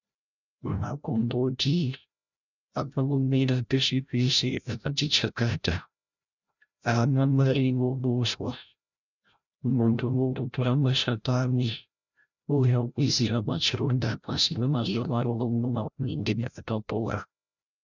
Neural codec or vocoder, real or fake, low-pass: codec, 16 kHz, 0.5 kbps, FreqCodec, larger model; fake; 7.2 kHz